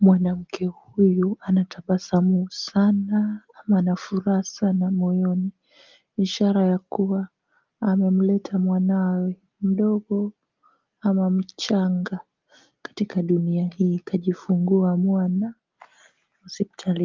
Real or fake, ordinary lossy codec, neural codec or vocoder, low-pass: real; Opus, 32 kbps; none; 7.2 kHz